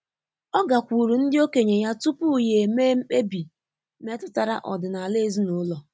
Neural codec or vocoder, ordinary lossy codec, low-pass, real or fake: none; none; none; real